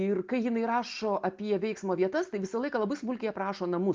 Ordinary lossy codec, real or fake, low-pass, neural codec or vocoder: Opus, 32 kbps; real; 7.2 kHz; none